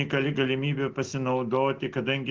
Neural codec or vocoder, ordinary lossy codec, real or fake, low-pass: none; Opus, 16 kbps; real; 7.2 kHz